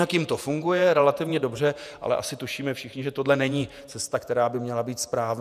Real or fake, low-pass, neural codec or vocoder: fake; 14.4 kHz; vocoder, 48 kHz, 128 mel bands, Vocos